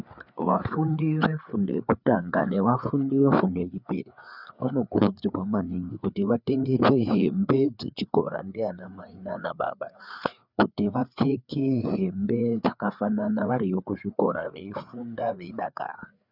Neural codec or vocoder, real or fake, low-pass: codec, 16 kHz, 4 kbps, FreqCodec, larger model; fake; 5.4 kHz